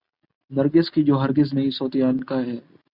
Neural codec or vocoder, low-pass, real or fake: none; 5.4 kHz; real